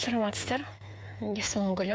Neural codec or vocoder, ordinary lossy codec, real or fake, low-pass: codec, 16 kHz, 8 kbps, FreqCodec, smaller model; none; fake; none